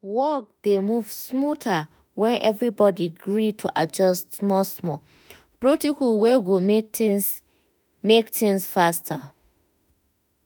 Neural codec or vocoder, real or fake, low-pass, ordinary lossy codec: autoencoder, 48 kHz, 32 numbers a frame, DAC-VAE, trained on Japanese speech; fake; none; none